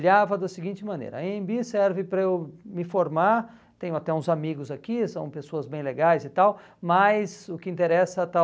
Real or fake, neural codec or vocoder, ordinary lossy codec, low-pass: real; none; none; none